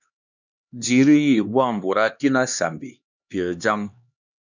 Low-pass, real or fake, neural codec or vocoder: 7.2 kHz; fake; codec, 16 kHz, 2 kbps, X-Codec, HuBERT features, trained on LibriSpeech